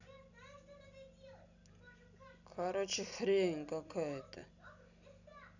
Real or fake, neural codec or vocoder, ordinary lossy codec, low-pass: real; none; none; 7.2 kHz